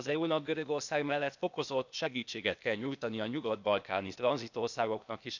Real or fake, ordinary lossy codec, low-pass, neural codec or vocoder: fake; none; 7.2 kHz; codec, 16 kHz, 0.8 kbps, ZipCodec